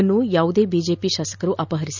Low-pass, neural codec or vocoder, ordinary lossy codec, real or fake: 7.2 kHz; none; none; real